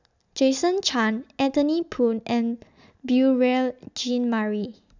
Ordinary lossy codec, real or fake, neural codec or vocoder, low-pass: none; real; none; 7.2 kHz